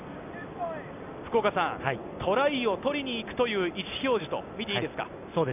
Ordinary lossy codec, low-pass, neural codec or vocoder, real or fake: none; 3.6 kHz; none; real